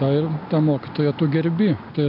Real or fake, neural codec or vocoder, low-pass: real; none; 5.4 kHz